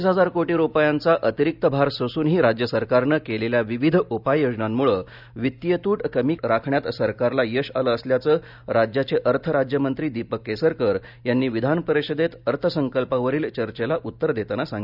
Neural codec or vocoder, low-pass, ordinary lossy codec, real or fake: none; 5.4 kHz; none; real